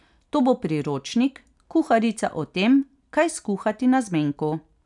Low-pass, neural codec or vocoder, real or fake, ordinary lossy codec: 10.8 kHz; none; real; none